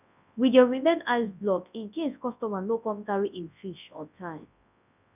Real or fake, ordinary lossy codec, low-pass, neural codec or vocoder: fake; none; 3.6 kHz; codec, 24 kHz, 0.9 kbps, WavTokenizer, large speech release